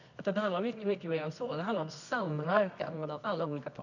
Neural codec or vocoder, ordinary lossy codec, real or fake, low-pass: codec, 24 kHz, 0.9 kbps, WavTokenizer, medium music audio release; none; fake; 7.2 kHz